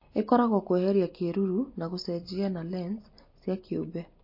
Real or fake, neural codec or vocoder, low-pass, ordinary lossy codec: real; none; 5.4 kHz; MP3, 32 kbps